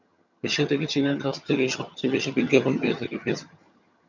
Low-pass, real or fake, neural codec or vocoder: 7.2 kHz; fake; vocoder, 22.05 kHz, 80 mel bands, HiFi-GAN